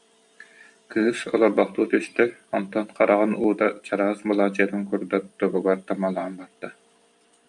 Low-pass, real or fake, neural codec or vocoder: 10.8 kHz; fake; vocoder, 44.1 kHz, 128 mel bands every 512 samples, BigVGAN v2